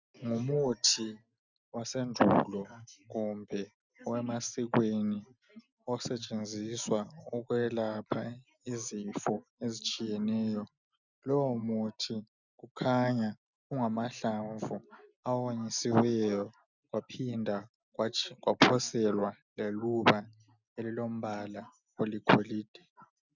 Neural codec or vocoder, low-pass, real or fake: none; 7.2 kHz; real